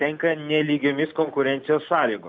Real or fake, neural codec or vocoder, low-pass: real; none; 7.2 kHz